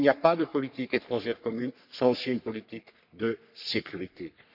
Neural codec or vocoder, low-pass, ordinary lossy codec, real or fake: codec, 44.1 kHz, 3.4 kbps, Pupu-Codec; 5.4 kHz; none; fake